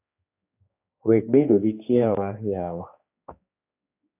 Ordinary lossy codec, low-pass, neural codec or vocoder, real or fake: AAC, 24 kbps; 3.6 kHz; codec, 16 kHz, 2 kbps, X-Codec, HuBERT features, trained on general audio; fake